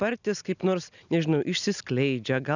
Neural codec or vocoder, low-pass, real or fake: none; 7.2 kHz; real